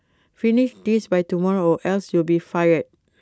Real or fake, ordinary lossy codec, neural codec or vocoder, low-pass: real; none; none; none